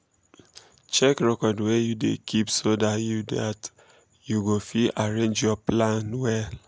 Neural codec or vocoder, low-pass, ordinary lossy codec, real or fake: none; none; none; real